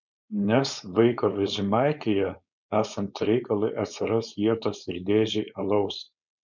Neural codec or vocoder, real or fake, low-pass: codec, 16 kHz, 4.8 kbps, FACodec; fake; 7.2 kHz